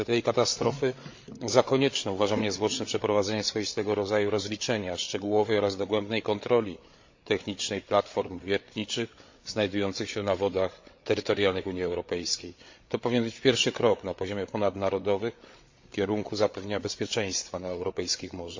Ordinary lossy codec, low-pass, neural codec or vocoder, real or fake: MP3, 48 kbps; 7.2 kHz; codec, 16 kHz, 8 kbps, FreqCodec, larger model; fake